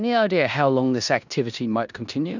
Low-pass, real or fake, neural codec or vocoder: 7.2 kHz; fake; codec, 16 kHz in and 24 kHz out, 0.9 kbps, LongCat-Audio-Codec, four codebook decoder